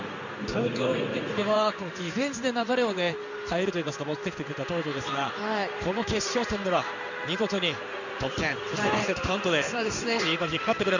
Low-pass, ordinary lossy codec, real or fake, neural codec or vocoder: 7.2 kHz; none; fake; codec, 16 kHz in and 24 kHz out, 1 kbps, XY-Tokenizer